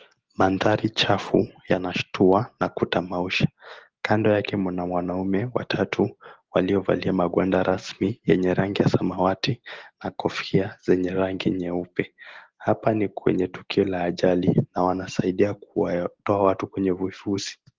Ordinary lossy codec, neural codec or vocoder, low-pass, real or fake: Opus, 16 kbps; none; 7.2 kHz; real